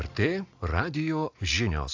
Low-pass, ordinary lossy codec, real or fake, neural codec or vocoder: 7.2 kHz; AAC, 48 kbps; real; none